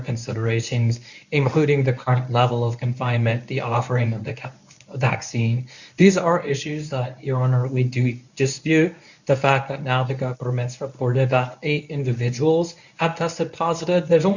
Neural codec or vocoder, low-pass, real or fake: codec, 24 kHz, 0.9 kbps, WavTokenizer, medium speech release version 1; 7.2 kHz; fake